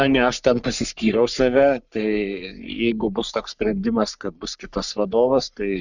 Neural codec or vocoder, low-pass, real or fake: codec, 44.1 kHz, 3.4 kbps, Pupu-Codec; 7.2 kHz; fake